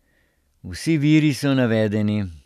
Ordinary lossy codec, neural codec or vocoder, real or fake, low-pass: none; none; real; 14.4 kHz